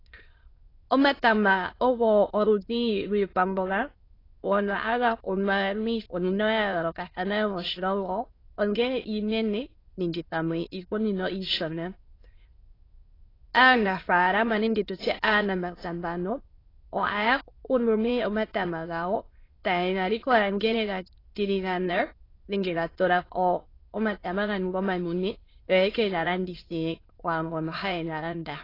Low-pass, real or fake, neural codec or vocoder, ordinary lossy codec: 5.4 kHz; fake; autoencoder, 22.05 kHz, a latent of 192 numbers a frame, VITS, trained on many speakers; AAC, 24 kbps